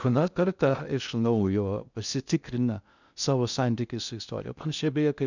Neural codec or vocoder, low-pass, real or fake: codec, 16 kHz in and 24 kHz out, 0.6 kbps, FocalCodec, streaming, 2048 codes; 7.2 kHz; fake